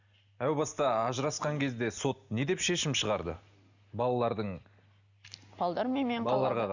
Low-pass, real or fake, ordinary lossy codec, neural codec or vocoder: 7.2 kHz; real; none; none